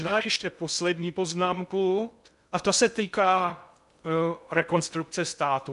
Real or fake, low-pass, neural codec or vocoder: fake; 10.8 kHz; codec, 16 kHz in and 24 kHz out, 0.6 kbps, FocalCodec, streaming, 2048 codes